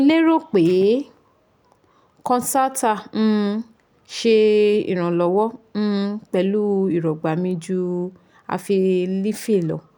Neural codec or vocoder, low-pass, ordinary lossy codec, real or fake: none; 19.8 kHz; none; real